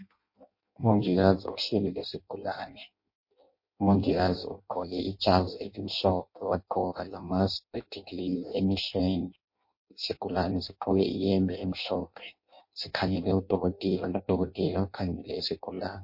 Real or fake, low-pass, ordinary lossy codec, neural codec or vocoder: fake; 5.4 kHz; MP3, 32 kbps; codec, 16 kHz in and 24 kHz out, 0.6 kbps, FireRedTTS-2 codec